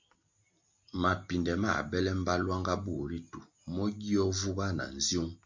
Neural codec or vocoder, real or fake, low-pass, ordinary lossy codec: none; real; 7.2 kHz; MP3, 48 kbps